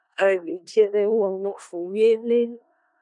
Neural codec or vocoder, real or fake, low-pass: codec, 16 kHz in and 24 kHz out, 0.4 kbps, LongCat-Audio-Codec, four codebook decoder; fake; 10.8 kHz